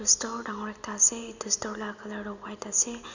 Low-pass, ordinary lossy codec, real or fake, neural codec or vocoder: 7.2 kHz; none; real; none